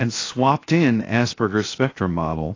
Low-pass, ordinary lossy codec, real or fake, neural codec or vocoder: 7.2 kHz; AAC, 32 kbps; fake; codec, 16 kHz, 0.7 kbps, FocalCodec